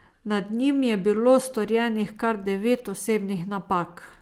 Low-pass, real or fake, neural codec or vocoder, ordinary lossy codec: 19.8 kHz; real; none; Opus, 24 kbps